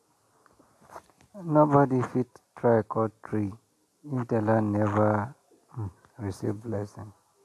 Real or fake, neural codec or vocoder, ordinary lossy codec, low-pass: real; none; AAC, 64 kbps; 14.4 kHz